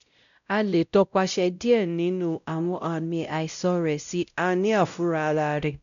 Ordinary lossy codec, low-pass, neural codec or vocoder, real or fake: none; 7.2 kHz; codec, 16 kHz, 0.5 kbps, X-Codec, WavLM features, trained on Multilingual LibriSpeech; fake